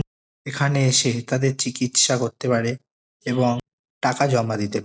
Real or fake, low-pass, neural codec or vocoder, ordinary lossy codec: real; none; none; none